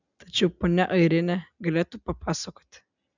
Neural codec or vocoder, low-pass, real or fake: none; 7.2 kHz; real